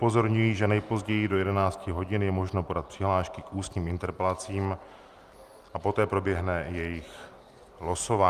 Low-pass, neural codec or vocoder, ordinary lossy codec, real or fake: 14.4 kHz; none; Opus, 32 kbps; real